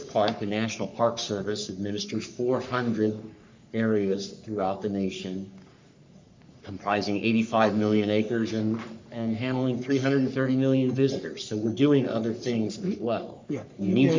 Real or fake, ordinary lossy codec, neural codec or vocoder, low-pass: fake; MP3, 64 kbps; codec, 44.1 kHz, 3.4 kbps, Pupu-Codec; 7.2 kHz